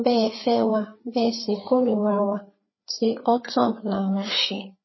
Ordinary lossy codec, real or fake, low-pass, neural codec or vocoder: MP3, 24 kbps; fake; 7.2 kHz; codec, 16 kHz, 4 kbps, FreqCodec, larger model